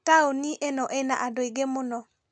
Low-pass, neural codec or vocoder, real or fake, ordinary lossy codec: 9.9 kHz; none; real; none